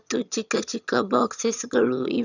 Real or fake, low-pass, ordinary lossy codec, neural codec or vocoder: fake; 7.2 kHz; none; vocoder, 22.05 kHz, 80 mel bands, HiFi-GAN